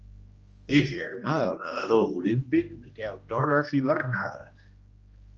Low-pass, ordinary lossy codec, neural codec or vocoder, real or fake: 7.2 kHz; Opus, 24 kbps; codec, 16 kHz, 1 kbps, X-Codec, HuBERT features, trained on general audio; fake